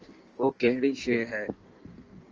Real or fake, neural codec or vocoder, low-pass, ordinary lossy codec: fake; codec, 16 kHz in and 24 kHz out, 1.1 kbps, FireRedTTS-2 codec; 7.2 kHz; Opus, 24 kbps